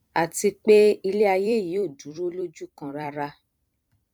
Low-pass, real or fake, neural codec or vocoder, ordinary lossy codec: 19.8 kHz; fake; vocoder, 44.1 kHz, 128 mel bands every 256 samples, BigVGAN v2; none